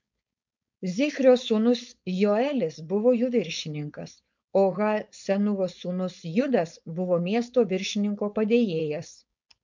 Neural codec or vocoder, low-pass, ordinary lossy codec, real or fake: codec, 16 kHz, 4.8 kbps, FACodec; 7.2 kHz; MP3, 64 kbps; fake